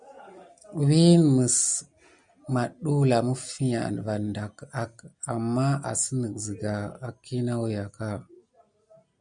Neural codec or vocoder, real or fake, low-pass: none; real; 9.9 kHz